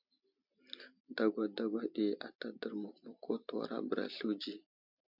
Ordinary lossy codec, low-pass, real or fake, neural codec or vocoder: MP3, 48 kbps; 5.4 kHz; real; none